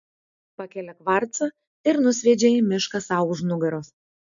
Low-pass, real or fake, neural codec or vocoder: 7.2 kHz; real; none